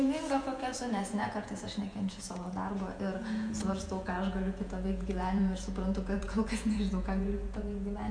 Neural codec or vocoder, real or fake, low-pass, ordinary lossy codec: vocoder, 48 kHz, 128 mel bands, Vocos; fake; 9.9 kHz; Opus, 64 kbps